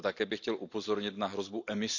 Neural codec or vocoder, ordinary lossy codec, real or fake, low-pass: none; none; real; 7.2 kHz